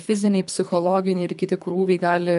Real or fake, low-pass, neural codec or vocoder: fake; 10.8 kHz; codec, 24 kHz, 3 kbps, HILCodec